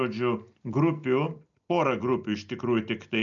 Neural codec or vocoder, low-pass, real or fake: none; 7.2 kHz; real